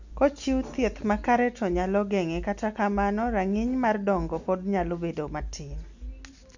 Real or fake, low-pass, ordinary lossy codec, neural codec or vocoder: real; 7.2 kHz; none; none